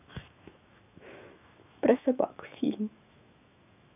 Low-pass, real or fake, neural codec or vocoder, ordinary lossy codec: 3.6 kHz; fake; autoencoder, 48 kHz, 128 numbers a frame, DAC-VAE, trained on Japanese speech; none